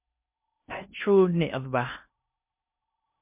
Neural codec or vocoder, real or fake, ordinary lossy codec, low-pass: codec, 16 kHz in and 24 kHz out, 0.6 kbps, FocalCodec, streaming, 4096 codes; fake; MP3, 32 kbps; 3.6 kHz